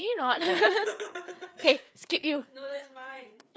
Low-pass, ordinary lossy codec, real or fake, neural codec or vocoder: none; none; fake; codec, 16 kHz, 4 kbps, FreqCodec, larger model